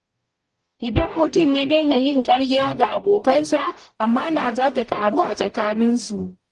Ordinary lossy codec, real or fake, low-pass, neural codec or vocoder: Opus, 24 kbps; fake; 10.8 kHz; codec, 44.1 kHz, 0.9 kbps, DAC